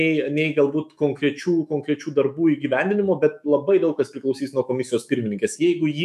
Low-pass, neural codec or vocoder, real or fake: 14.4 kHz; autoencoder, 48 kHz, 128 numbers a frame, DAC-VAE, trained on Japanese speech; fake